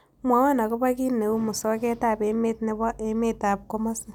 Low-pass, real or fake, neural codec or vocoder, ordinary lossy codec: 19.8 kHz; real; none; none